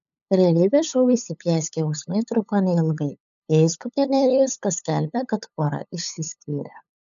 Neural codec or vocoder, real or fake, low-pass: codec, 16 kHz, 8 kbps, FunCodec, trained on LibriTTS, 25 frames a second; fake; 7.2 kHz